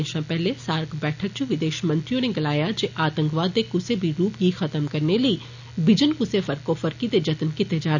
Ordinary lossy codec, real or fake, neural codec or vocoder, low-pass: none; real; none; 7.2 kHz